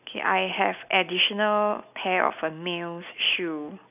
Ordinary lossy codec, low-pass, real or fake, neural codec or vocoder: none; 3.6 kHz; real; none